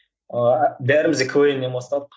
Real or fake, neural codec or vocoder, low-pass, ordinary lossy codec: real; none; none; none